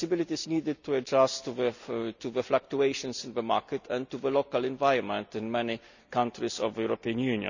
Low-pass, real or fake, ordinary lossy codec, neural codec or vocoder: 7.2 kHz; real; none; none